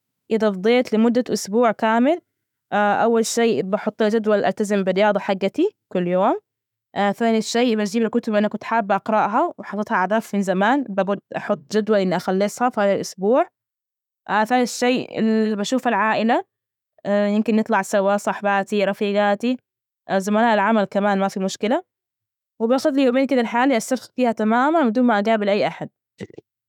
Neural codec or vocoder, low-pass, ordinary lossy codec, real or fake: autoencoder, 48 kHz, 128 numbers a frame, DAC-VAE, trained on Japanese speech; 19.8 kHz; none; fake